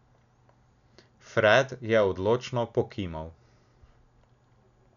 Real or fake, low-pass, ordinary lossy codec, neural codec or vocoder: real; 7.2 kHz; none; none